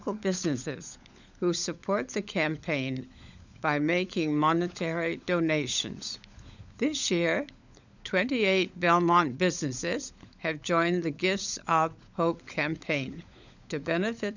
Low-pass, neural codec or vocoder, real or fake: 7.2 kHz; codec, 16 kHz, 16 kbps, FunCodec, trained on LibriTTS, 50 frames a second; fake